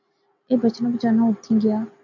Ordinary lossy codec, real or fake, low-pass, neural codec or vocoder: MP3, 64 kbps; real; 7.2 kHz; none